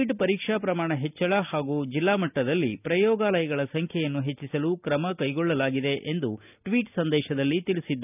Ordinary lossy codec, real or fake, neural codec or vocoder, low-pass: none; real; none; 3.6 kHz